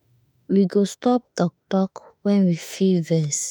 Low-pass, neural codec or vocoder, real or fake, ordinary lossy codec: none; autoencoder, 48 kHz, 32 numbers a frame, DAC-VAE, trained on Japanese speech; fake; none